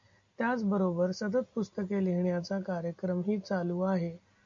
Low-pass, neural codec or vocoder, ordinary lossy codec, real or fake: 7.2 kHz; none; AAC, 48 kbps; real